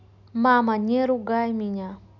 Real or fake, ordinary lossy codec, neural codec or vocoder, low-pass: real; none; none; 7.2 kHz